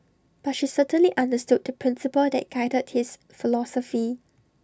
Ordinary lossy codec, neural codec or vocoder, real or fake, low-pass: none; none; real; none